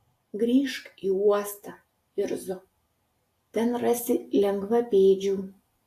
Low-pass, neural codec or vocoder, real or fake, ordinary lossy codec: 14.4 kHz; none; real; AAC, 64 kbps